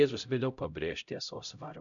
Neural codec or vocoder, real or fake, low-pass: codec, 16 kHz, 0.5 kbps, X-Codec, HuBERT features, trained on LibriSpeech; fake; 7.2 kHz